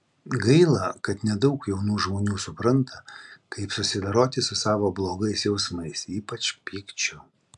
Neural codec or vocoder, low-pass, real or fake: none; 10.8 kHz; real